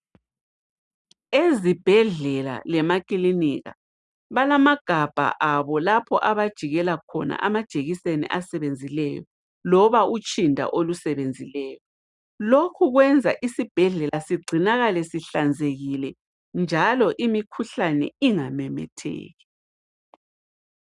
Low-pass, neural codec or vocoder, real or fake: 10.8 kHz; none; real